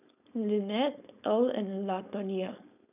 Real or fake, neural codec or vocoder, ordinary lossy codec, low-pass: fake; codec, 16 kHz, 4.8 kbps, FACodec; none; 3.6 kHz